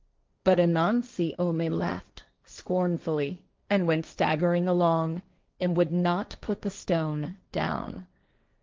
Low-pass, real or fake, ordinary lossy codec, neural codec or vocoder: 7.2 kHz; fake; Opus, 24 kbps; codec, 16 kHz, 1.1 kbps, Voila-Tokenizer